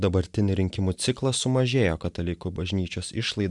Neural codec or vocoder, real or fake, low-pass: none; real; 10.8 kHz